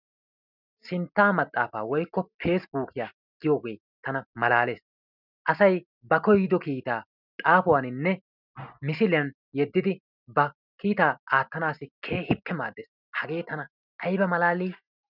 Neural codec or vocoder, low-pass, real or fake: none; 5.4 kHz; real